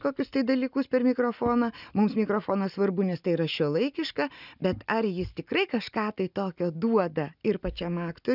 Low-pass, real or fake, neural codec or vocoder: 5.4 kHz; real; none